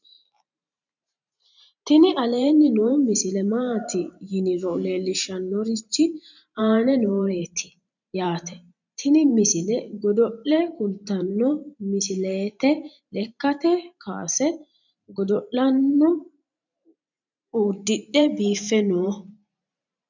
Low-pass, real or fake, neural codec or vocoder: 7.2 kHz; fake; vocoder, 44.1 kHz, 128 mel bands every 512 samples, BigVGAN v2